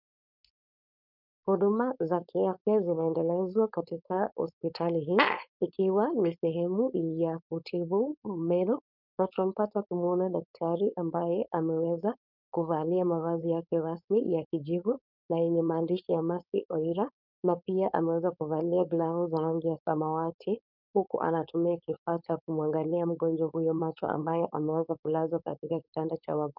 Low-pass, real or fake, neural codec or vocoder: 5.4 kHz; fake; codec, 16 kHz, 4.8 kbps, FACodec